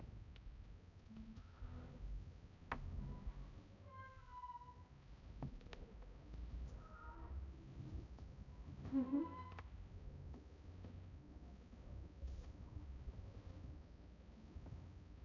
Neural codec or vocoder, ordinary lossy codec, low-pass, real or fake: codec, 16 kHz, 0.5 kbps, X-Codec, HuBERT features, trained on balanced general audio; none; 7.2 kHz; fake